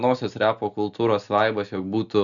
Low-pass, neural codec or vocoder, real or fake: 7.2 kHz; none; real